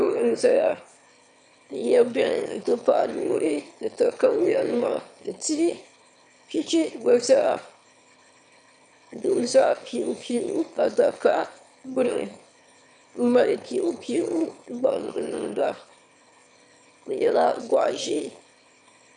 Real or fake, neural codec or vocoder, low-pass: fake; autoencoder, 22.05 kHz, a latent of 192 numbers a frame, VITS, trained on one speaker; 9.9 kHz